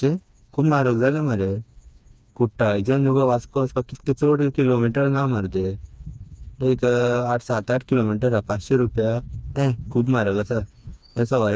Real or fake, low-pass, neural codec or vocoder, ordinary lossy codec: fake; none; codec, 16 kHz, 2 kbps, FreqCodec, smaller model; none